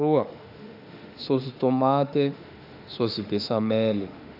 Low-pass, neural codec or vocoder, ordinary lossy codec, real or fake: 5.4 kHz; autoencoder, 48 kHz, 32 numbers a frame, DAC-VAE, trained on Japanese speech; none; fake